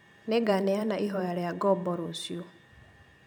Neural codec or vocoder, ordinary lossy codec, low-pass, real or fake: vocoder, 44.1 kHz, 128 mel bands every 512 samples, BigVGAN v2; none; none; fake